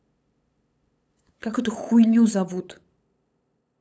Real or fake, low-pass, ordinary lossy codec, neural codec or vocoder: fake; none; none; codec, 16 kHz, 8 kbps, FunCodec, trained on LibriTTS, 25 frames a second